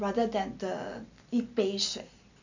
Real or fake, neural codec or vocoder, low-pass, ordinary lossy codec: real; none; 7.2 kHz; none